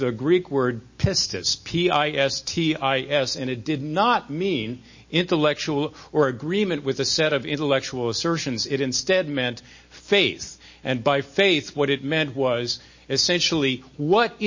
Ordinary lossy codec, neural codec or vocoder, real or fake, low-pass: MP3, 32 kbps; none; real; 7.2 kHz